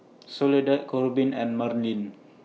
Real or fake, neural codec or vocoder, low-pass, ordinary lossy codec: real; none; none; none